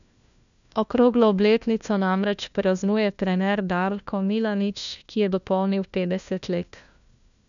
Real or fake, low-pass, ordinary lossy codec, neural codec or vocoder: fake; 7.2 kHz; none; codec, 16 kHz, 1 kbps, FunCodec, trained on LibriTTS, 50 frames a second